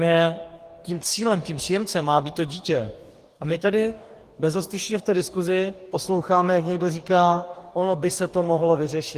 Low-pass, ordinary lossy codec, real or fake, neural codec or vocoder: 14.4 kHz; Opus, 24 kbps; fake; codec, 44.1 kHz, 2.6 kbps, DAC